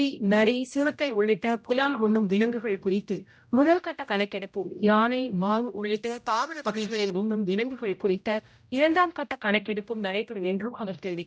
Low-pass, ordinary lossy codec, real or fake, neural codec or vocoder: none; none; fake; codec, 16 kHz, 0.5 kbps, X-Codec, HuBERT features, trained on general audio